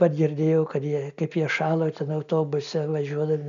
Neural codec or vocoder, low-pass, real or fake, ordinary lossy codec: none; 7.2 kHz; real; MP3, 96 kbps